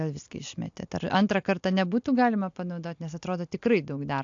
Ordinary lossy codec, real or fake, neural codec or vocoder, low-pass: AAC, 64 kbps; real; none; 7.2 kHz